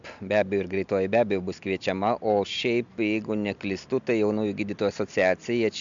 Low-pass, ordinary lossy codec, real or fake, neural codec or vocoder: 7.2 kHz; MP3, 64 kbps; real; none